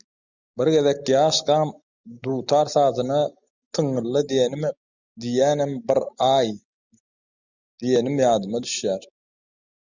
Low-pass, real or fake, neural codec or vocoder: 7.2 kHz; real; none